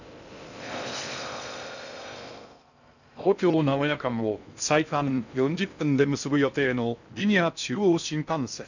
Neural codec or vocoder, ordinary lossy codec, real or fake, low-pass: codec, 16 kHz in and 24 kHz out, 0.6 kbps, FocalCodec, streaming, 2048 codes; none; fake; 7.2 kHz